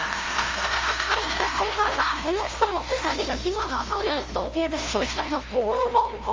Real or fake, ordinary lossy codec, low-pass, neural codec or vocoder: fake; Opus, 32 kbps; 7.2 kHz; codec, 16 kHz, 0.5 kbps, FunCodec, trained on LibriTTS, 25 frames a second